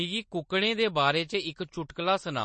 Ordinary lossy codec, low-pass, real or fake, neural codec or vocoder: MP3, 32 kbps; 10.8 kHz; real; none